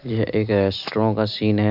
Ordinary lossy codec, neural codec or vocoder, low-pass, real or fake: none; none; 5.4 kHz; real